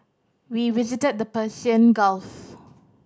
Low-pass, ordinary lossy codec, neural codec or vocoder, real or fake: none; none; none; real